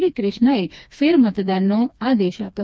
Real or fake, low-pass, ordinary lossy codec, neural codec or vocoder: fake; none; none; codec, 16 kHz, 2 kbps, FreqCodec, smaller model